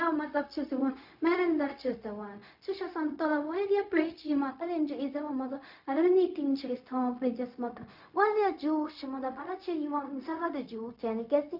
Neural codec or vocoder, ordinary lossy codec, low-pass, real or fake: codec, 16 kHz, 0.4 kbps, LongCat-Audio-Codec; none; 5.4 kHz; fake